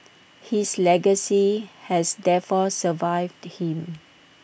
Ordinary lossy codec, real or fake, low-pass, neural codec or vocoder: none; real; none; none